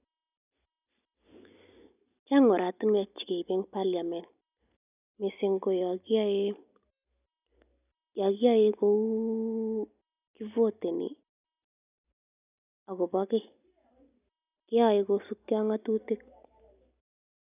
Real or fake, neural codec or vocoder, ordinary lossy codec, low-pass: real; none; none; 3.6 kHz